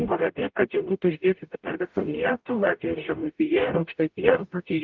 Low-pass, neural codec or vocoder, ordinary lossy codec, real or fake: 7.2 kHz; codec, 44.1 kHz, 0.9 kbps, DAC; Opus, 32 kbps; fake